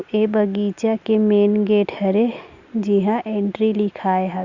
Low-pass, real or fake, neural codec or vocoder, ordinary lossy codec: 7.2 kHz; real; none; Opus, 64 kbps